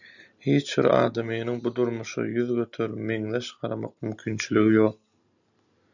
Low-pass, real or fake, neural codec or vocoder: 7.2 kHz; real; none